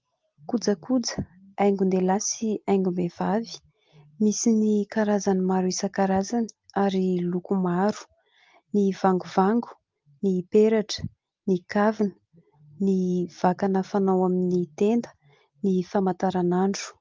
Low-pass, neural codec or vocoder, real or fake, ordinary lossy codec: 7.2 kHz; none; real; Opus, 32 kbps